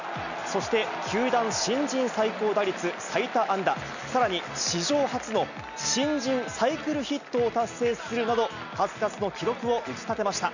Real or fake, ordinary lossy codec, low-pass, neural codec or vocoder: real; none; 7.2 kHz; none